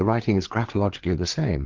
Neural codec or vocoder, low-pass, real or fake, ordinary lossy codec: codec, 16 kHz, 4 kbps, FreqCodec, larger model; 7.2 kHz; fake; Opus, 16 kbps